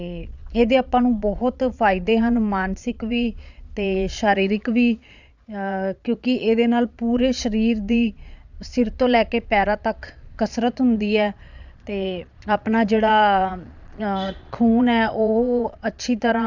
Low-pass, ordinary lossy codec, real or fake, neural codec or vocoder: 7.2 kHz; none; fake; vocoder, 22.05 kHz, 80 mel bands, Vocos